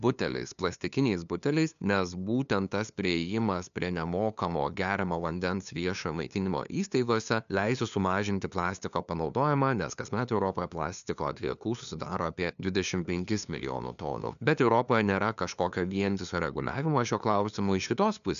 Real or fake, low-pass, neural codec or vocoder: fake; 7.2 kHz; codec, 16 kHz, 2 kbps, FunCodec, trained on LibriTTS, 25 frames a second